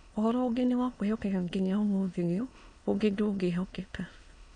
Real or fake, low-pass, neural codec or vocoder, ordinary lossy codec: fake; 9.9 kHz; autoencoder, 22.05 kHz, a latent of 192 numbers a frame, VITS, trained on many speakers; none